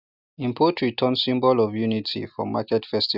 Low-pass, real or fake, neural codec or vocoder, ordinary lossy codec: 5.4 kHz; real; none; Opus, 64 kbps